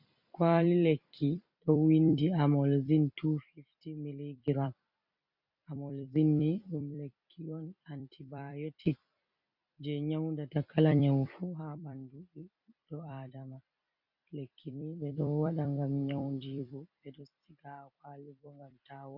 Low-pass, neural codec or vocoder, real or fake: 5.4 kHz; vocoder, 44.1 kHz, 128 mel bands every 256 samples, BigVGAN v2; fake